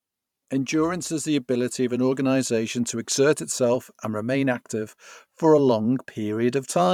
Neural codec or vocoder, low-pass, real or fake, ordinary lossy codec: vocoder, 44.1 kHz, 128 mel bands every 512 samples, BigVGAN v2; 19.8 kHz; fake; none